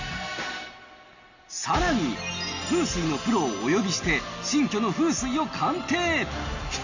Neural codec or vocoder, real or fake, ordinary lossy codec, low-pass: none; real; none; 7.2 kHz